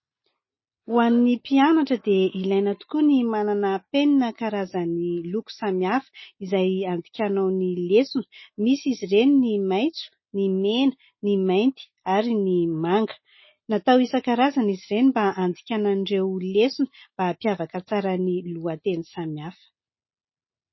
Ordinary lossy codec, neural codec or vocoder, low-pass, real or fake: MP3, 24 kbps; none; 7.2 kHz; real